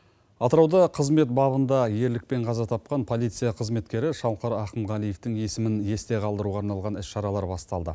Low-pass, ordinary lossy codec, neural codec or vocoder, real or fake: none; none; none; real